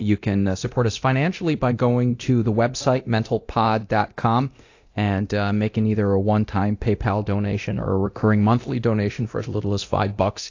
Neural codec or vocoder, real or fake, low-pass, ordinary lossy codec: codec, 24 kHz, 0.9 kbps, DualCodec; fake; 7.2 kHz; AAC, 48 kbps